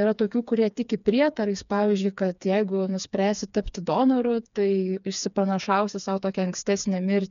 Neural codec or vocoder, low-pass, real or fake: codec, 16 kHz, 4 kbps, FreqCodec, smaller model; 7.2 kHz; fake